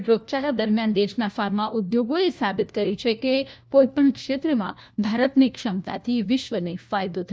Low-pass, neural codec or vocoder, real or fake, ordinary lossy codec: none; codec, 16 kHz, 1 kbps, FunCodec, trained on LibriTTS, 50 frames a second; fake; none